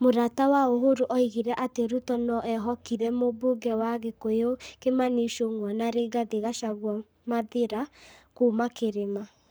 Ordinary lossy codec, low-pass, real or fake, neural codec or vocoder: none; none; fake; codec, 44.1 kHz, 7.8 kbps, Pupu-Codec